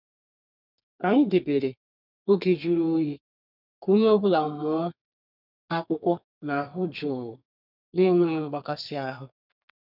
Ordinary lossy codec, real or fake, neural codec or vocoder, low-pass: none; fake; codec, 32 kHz, 1.9 kbps, SNAC; 5.4 kHz